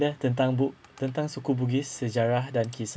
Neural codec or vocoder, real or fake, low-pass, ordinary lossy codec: none; real; none; none